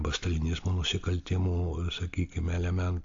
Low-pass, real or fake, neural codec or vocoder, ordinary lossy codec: 7.2 kHz; real; none; AAC, 48 kbps